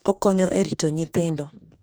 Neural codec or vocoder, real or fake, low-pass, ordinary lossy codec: codec, 44.1 kHz, 2.6 kbps, DAC; fake; none; none